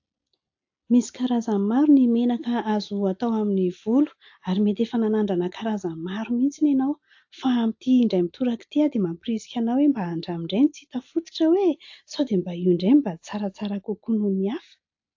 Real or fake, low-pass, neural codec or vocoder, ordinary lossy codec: real; 7.2 kHz; none; AAC, 48 kbps